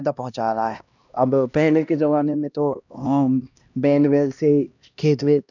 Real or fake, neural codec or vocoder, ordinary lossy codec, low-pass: fake; codec, 16 kHz, 1 kbps, X-Codec, HuBERT features, trained on LibriSpeech; none; 7.2 kHz